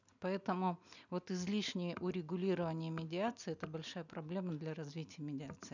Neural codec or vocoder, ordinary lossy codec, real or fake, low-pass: none; none; real; 7.2 kHz